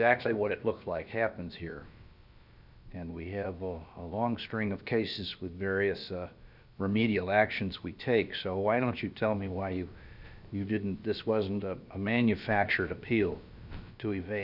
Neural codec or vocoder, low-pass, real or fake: codec, 16 kHz, about 1 kbps, DyCAST, with the encoder's durations; 5.4 kHz; fake